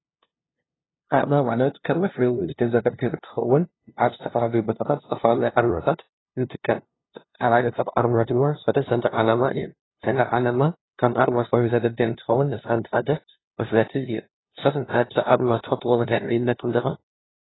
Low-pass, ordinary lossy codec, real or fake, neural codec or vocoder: 7.2 kHz; AAC, 16 kbps; fake; codec, 16 kHz, 0.5 kbps, FunCodec, trained on LibriTTS, 25 frames a second